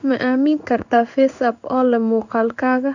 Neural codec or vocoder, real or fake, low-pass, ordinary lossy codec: codec, 16 kHz in and 24 kHz out, 1 kbps, XY-Tokenizer; fake; 7.2 kHz; none